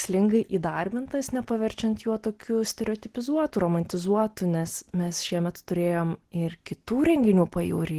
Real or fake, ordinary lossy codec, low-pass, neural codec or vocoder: real; Opus, 16 kbps; 14.4 kHz; none